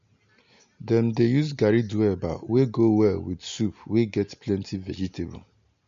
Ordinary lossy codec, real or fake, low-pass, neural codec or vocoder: MP3, 48 kbps; real; 7.2 kHz; none